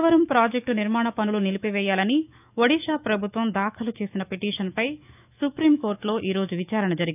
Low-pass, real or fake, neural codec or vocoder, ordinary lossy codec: 3.6 kHz; fake; autoencoder, 48 kHz, 128 numbers a frame, DAC-VAE, trained on Japanese speech; none